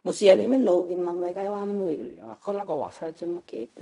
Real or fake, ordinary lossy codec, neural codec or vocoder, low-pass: fake; none; codec, 16 kHz in and 24 kHz out, 0.4 kbps, LongCat-Audio-Codec, fine tuned four codebook decoder; 10.8 kHz